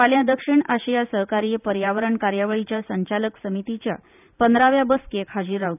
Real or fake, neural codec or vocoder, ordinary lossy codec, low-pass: fake; vocoder, 44.1 kHz, 128 mel bands every 512 samples, BigVGAN v2; none; 3.6 kHz